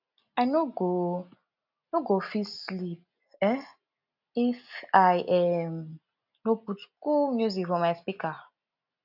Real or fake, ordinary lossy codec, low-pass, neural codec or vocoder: real; none; 5.4 kHz; none